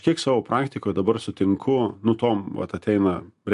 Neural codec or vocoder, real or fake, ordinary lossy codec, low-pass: none; real; MP3, 64 kbps; 10.8 kHz